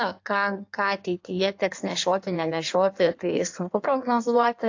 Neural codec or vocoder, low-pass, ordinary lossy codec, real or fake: codec, 16 kHz in and 24 kHz out, 1.1 kbps, FireRedTTS-2 codec; 7.2 kHz; AAC, 48 kbps; fake